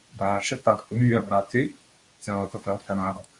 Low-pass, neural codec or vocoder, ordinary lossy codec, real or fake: 10.8 kHz; codec, 24 kHz, 0.9 kbps, WavTokenizer, medium speech release version 2; Opus, 64 kbps; fake